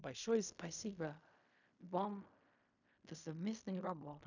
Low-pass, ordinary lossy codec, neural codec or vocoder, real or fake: 7.2 kHz; none; codec, 16 kHz in and 24 kHz out, 0.4 kbps, LongCat-Audio-Codec, fine tuned four codebook decoder; fake